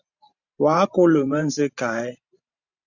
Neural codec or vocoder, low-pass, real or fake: vocoder, 44.1 kHz, 128 mel bands every 512 samples, BigVGAN v2; 7.2 kHz; fake